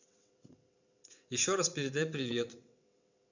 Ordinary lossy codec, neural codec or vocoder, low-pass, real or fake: none; vocoder, 22.05 kHz, 80 mel bands, WaveNeXt; 7.2 kHz; fake